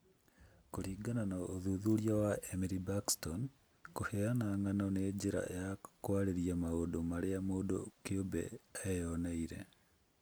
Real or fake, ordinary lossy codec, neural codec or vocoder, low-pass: real; none; none; none